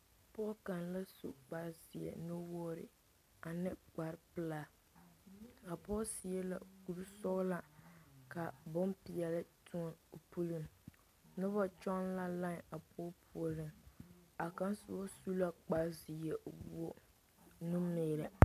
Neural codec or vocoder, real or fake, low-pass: none; real; 14.4 kHz